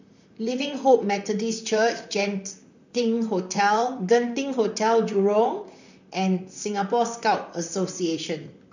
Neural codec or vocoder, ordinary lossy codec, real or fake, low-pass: vocoder, 44.1 kHz, 128 mel bands, Pupu-Vocoder; none; fake; 7.2 kHz